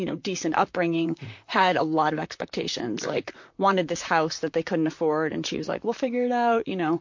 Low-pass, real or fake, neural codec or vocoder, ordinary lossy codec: 7.2 kHz; fake; vocoder, 44.1 kHz, 128 mel bands, Pupu-Vocoder; MP3, 48 kbps